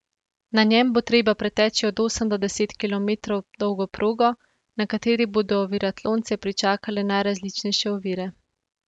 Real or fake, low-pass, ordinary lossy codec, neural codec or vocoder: real; 10.8 kHz; none; none